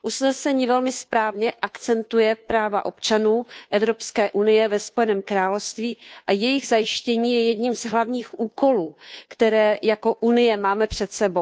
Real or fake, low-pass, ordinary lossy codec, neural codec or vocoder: fake; none; none; codec, 16 kHz, 2 kbps, FunCodec, trained on Chinese and English, 25 frames a second